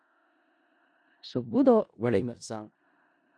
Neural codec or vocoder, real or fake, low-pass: codec, 16 kHz in and 24 kHz out, 0.4 kbps, LongCat-Audio-Codec, four codebook decoder; fake; 9.9 kHz